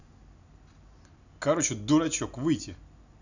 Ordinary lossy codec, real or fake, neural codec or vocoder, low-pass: none; real; none; 7.2 kHz